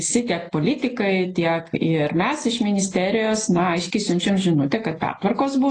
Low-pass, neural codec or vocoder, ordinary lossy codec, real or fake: 10.8 kHz; none; AAC, 32 kbps; real